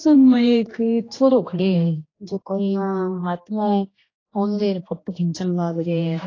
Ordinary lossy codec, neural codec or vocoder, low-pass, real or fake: AAC, 32 kbps; codec, 16 kHz, 1 kbps, X-Codec, HuBERT features, trained on general audio; 7.2 kHz; fake